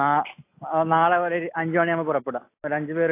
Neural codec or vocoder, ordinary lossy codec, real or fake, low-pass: none; AAC, 24 kbps; real; 3.6 kHz